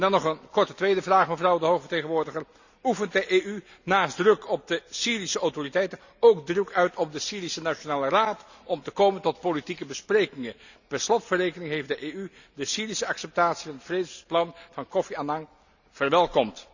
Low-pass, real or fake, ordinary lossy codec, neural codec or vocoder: 7.2 kHz; real; none; none